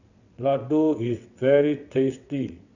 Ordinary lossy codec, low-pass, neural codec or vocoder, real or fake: none; 7.2 kHz; vocoder, 22.05 kHz, 80 mel bands, WaveNeXt; fake